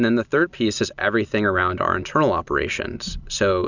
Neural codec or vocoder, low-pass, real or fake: none; 7.2 kHz; real